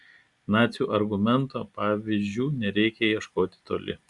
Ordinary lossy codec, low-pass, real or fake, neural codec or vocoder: Opus, 64 kbps; 10.8 kHz; real; none